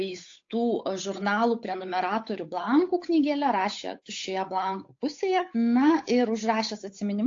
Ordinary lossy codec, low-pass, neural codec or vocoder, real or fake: AAC, 48 kbps; 7.2 kHz; codec, 16 kHz, 8 kbps, FunCodec, trained on Chinese and English, 25 frames a second; fake